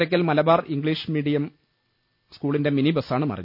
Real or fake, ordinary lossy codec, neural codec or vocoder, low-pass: real; none; none; 5.4 kHz